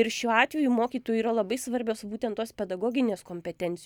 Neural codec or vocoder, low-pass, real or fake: none; 19.8 kHz; real